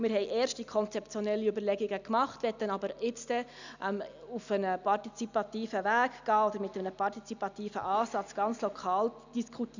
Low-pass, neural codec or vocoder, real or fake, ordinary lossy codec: 7.2 kHz; none; real; none